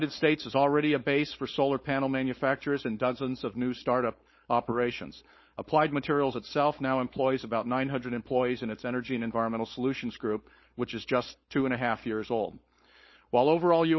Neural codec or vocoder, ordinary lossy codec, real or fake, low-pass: codec, 16 kHz, 4.8 kbps, FACodec; MP3, 24 kbps; fake; 7.2 kHz